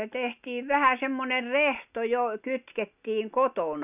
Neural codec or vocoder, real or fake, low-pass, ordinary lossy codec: none; real; 3.6 kHz; none